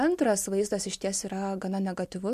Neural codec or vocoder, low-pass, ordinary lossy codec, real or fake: none; 14.4 kHz; MP3, 64 kbps; real